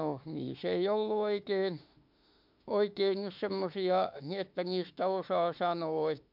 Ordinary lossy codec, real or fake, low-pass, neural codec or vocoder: MP3, 48 kbps; fake; 5.4 kHz; autoencoder, 48 kHz, 32 numbers a frame, DAC-VAE, trained on Japanese speech